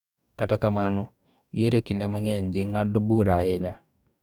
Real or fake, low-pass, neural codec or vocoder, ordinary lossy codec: fake; 19.8 kHz; codec, 44.1 kHz, 2.6 kbps, DAC; none